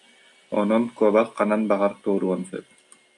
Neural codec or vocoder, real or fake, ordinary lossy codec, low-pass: none; real; AAC, 64 kbps; 10.8 kHz